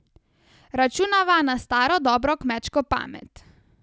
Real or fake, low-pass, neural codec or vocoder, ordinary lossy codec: real; none; none; none